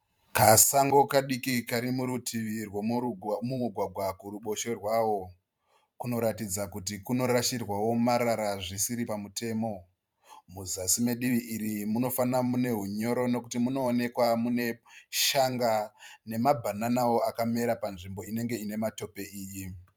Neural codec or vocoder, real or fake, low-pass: vocoder, 44.1 kHz, 128 mel bands every 512 samples, BigVGAN v2; fake; 19.8 kHz